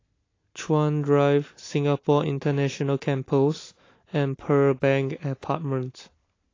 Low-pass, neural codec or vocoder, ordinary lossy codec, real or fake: 7.2 kHz; none; AAC, 32 kbps; real